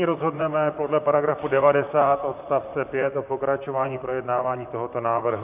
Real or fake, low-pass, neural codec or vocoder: fake; 3.6 kHz; vocoder, 44.1 kHz, 128 mel bands, Pupu-Vocoder